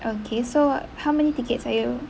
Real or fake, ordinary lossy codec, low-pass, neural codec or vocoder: real; none; none; none